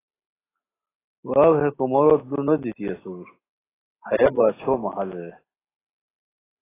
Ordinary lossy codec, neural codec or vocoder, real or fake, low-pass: AAC, 16 kbps; none; real; 3.6 kHz